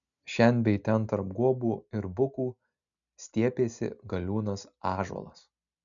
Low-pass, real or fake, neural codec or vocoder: 7.2 kHz; real; none